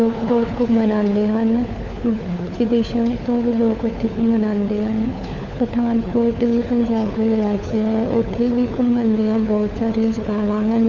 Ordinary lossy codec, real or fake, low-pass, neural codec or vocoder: none; fake; 7.2 kHz; codec, 16 kHz, 4 kbps, FunCodec, trained on LibriTTS, 50 frames a second